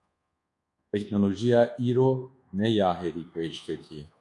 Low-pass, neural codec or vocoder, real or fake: 10.8 kHz; codec, 24 kHz, 1.2 kbps, DualCodec; fake